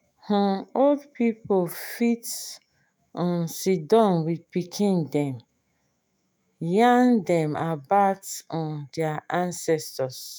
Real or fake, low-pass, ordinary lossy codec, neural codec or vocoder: fake; none; none; autoencoder, 48 kHz, 128 numbers a frame, DAC-VAE, trained on Japanese speech